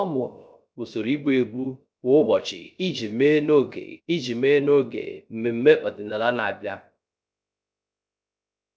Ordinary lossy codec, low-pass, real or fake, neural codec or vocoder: none; none; fake; codec, 16 kHz, 0.3 kbps, FocalCodec